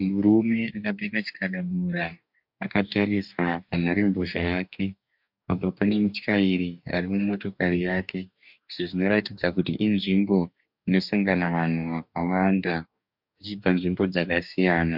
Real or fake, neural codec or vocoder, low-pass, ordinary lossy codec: fake; codec, 44.1 kHz, 2.6 kbps, DAC; 5.4 kHz; MP3, 48 kbps